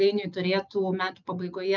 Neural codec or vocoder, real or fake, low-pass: none; real; 7.2 kHz